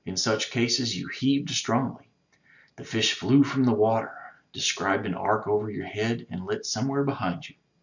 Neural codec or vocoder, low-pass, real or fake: none; 7.2 kHz; real